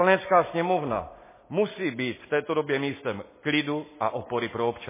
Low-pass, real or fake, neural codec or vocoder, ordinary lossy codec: 3.6 kHz; real; none; MP3, 16 kbps